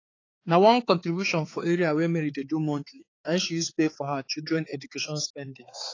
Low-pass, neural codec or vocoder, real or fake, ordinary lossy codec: 7.2 kHz; codec, 16 kHz, 4 kbps, X-Codec, HuBERT features, trained on balanced general audio; fake; AAC, 32 kbps